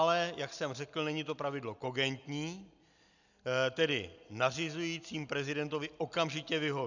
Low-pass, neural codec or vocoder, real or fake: 7.2 kHz; none; real